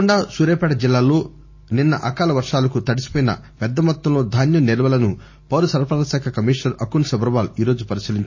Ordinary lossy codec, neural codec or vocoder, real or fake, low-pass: MP3, 32 kbps; none; real; 7.2 kHz